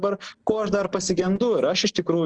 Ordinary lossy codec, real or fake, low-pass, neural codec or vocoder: Opus, 16 kbps; real; 9.9 kHz; none